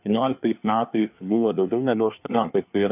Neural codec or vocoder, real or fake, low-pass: codec, 24 kHz, 1 kbps, SNAC; fake; 3.6 kHz